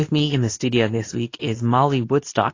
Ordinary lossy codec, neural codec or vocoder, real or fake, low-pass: AAC, 32 kbps; codec, 24 kHz, 0.9 kbps, WavTokenizer, medium speech release version 2; fake; 7.2 kHz